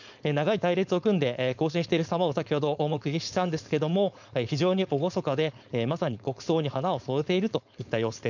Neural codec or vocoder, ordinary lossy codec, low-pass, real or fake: codec, 16 kHz, 4.8 kbps, FACodec; none; 7.2 kHz; fake